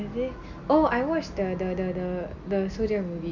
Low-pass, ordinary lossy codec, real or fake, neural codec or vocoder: 7.2 kHz; none; real; none